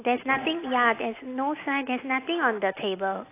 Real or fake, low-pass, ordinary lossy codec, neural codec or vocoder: real; 3.6 kHz; AAC, 24 kbps; none